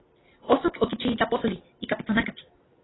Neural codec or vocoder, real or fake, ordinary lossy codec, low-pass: none; real; AAC, 16 kbps; 7.2 kHz